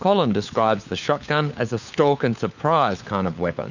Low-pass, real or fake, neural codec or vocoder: 7.2 kHz; fake; codec, 16 kHz, 4 kbps, FunCodec, trained on LibriTTS, 50 frames a second